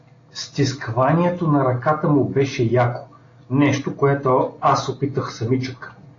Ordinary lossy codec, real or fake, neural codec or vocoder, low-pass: AAC, 32 kbps; real; none; 7.2 kHz